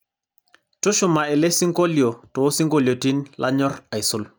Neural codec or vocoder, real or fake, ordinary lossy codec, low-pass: none; real; none; none